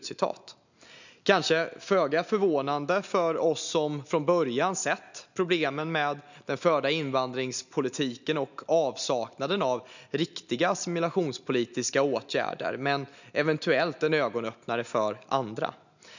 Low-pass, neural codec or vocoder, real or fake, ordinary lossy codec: 7.2 kHz; none; real; none